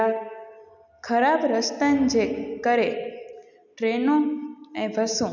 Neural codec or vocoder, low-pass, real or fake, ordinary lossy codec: none; 7.2 kHz; real; none